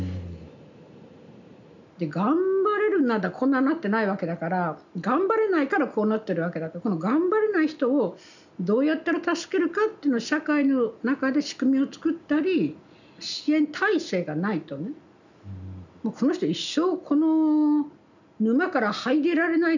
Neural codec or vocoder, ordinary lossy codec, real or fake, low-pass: none; none; real; 7.2 kHz